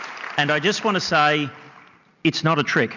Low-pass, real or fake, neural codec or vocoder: 7.2 kHz; real; none